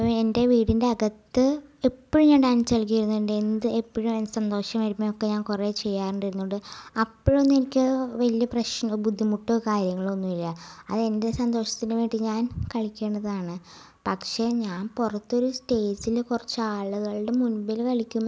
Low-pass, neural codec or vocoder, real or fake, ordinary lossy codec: none; none; real; none